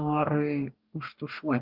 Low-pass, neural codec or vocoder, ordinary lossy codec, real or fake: 5.4 kHz; codec, 44.1 kHz, 2.6 kbps, DAC; Opus, 32 kbps; fake